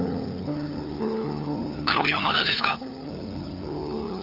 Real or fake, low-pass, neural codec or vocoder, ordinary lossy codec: fake; 5.4 kHz; codec, 16 kHz, 4 kbps, FunCodec, trained on LibriTTS, 50 frames a second; none